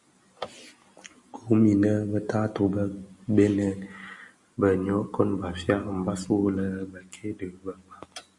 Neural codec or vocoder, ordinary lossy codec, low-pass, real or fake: none; Opus, 64 kbps; 10.8 kHz; real